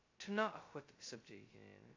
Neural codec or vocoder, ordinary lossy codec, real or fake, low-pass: codec, 16 kHz, 0.2 kbps, FocalCodec; AAC, 32 kbps; fake; 7.2 kHz